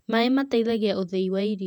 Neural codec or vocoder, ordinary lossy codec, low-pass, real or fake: vocoder, 48 kHz, 128 mel bands, Vocos; none; 19.8 kHz; fake